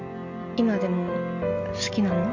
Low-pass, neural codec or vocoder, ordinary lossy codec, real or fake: 7.2 kHz; none; MP3, 64 kbps; real